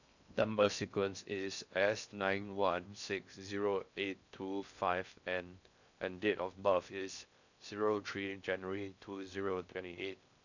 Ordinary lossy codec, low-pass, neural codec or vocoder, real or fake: none; 7.2 kHz; codec, 16 kHz in and 24 kHz out, 0.6 kbps, FocalCodec, streaming, 2048 codes; fake